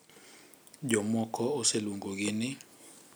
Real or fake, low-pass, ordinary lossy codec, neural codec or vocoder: real; none; none; none